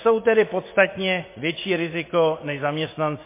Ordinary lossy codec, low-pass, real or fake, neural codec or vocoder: MP3, 24 kbps; 3.6 kHz; fake; vocoder, 24 kHz, 100 mel bands, Vocos